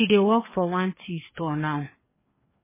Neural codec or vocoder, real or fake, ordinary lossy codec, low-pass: codec, 16 kHz, 2 kbps, FreqCodec, larger model; fake; MP3, 16 kbps; 3.6 kHz